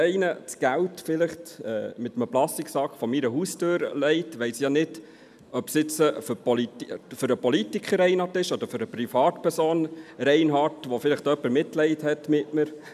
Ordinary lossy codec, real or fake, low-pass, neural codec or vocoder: none; real; 14.4 kHz; none